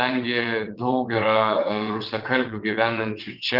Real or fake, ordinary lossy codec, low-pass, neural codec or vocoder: fake; Opus, 24 kbps; 5.4 kHz; vocoder, 22.05 kHz, 80 mel bands, WaveNeXt